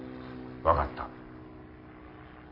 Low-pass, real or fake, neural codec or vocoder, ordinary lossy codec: 5.4 kHz; real; none; MP3, 24 kbps